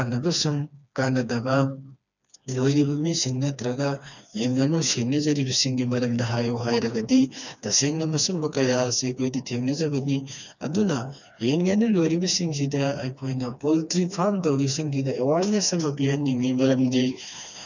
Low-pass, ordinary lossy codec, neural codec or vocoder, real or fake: 7.2 kHz; none; codec, 16 kHz, 2 kbps, FreqCodec, smaller model; fake